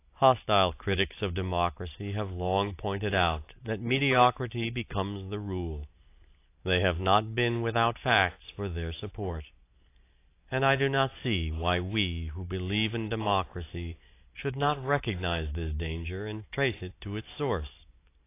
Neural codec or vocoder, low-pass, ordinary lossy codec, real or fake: none; 3.6 kHz; AAC, 24 kbps; real